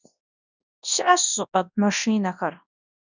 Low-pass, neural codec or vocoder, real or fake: 7.2 kHz; codec, 24 kHz, 0.9 kbps, WavTokenizer, large speech release; fake